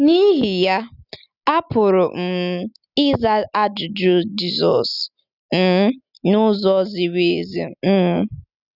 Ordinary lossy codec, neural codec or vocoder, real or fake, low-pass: none; none; real; 5.4 kHz